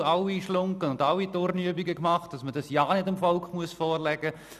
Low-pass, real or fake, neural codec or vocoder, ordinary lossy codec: 14.4 kHz; real; none; none